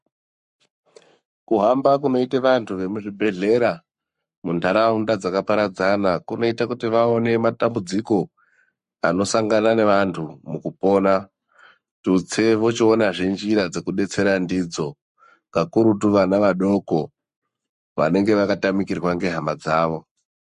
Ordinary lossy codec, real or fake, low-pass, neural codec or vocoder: MP3, 48 kbps; fake; 14.4 kHz; codec, 44.1 kHz, 7.8 kbps, Pupu-Codec